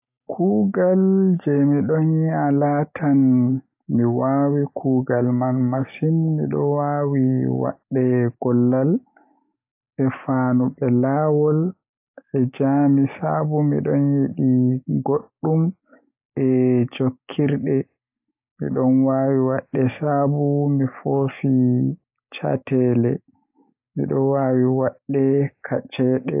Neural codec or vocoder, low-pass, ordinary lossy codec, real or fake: none; 3.6 kHz; AAC, 32 kbps; real